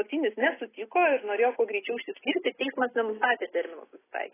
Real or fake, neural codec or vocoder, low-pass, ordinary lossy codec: real; none; 3.6 kHz; AAC, 16 kbps